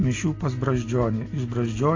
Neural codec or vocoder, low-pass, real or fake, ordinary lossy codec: none; 7.2 kHz; real; AAC, 32 kbps